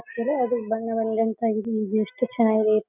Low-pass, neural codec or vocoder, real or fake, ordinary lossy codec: 3.6 kHz; none; real; none